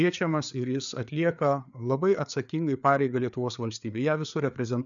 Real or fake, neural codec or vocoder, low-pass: fake; codec, 16 kHz, 4 kbps, FreqCodec, larger model; 7.2 kHz